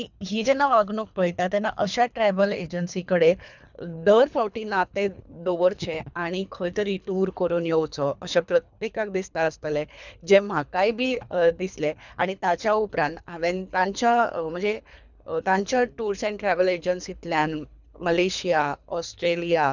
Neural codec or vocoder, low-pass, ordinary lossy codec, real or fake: codec, 24 kHz, 3 kbps, HILCodec; 7.2 kHz; none; fake